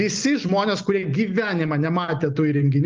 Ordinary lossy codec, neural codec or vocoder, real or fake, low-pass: Opus, 32 kbps; none; real; 7.2 kHz